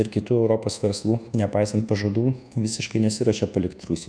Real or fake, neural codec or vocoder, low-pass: fake; codec, 24 kHz, 1.2 kbps, DualCodec; 9.9 kHz